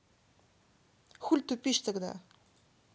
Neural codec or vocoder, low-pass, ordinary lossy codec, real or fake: none; none; none; real